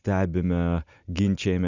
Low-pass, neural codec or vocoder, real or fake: 7.2 kHz; none; real